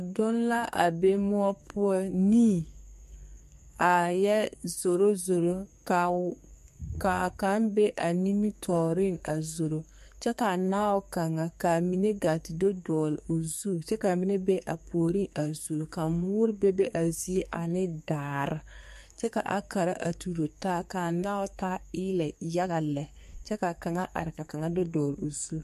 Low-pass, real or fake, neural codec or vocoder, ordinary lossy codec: 14.4 kHz; fake; codec, 44.1 kHz, 2.6 kbps, SNAC; MP3, 64 kbps